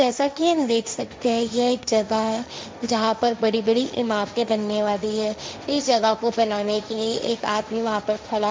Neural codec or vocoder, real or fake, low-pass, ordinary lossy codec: codec, 16 kHz, 1.1 kbps, Voila-Tokenizer; fake; none; none